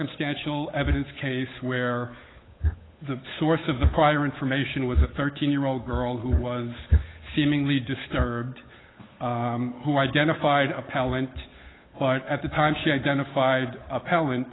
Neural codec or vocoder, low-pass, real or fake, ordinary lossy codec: codec, 16 kHz, 8 kbps, FunCodec, trained on Chinese and English, 25 frames a second; 7.2 kHz; fake; AAC, 16 kbps